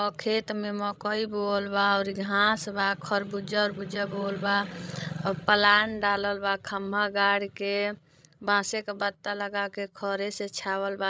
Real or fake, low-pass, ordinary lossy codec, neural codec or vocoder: fake; none; none; codec, 16 kHz, 16 kbps, FreqCodec, larger model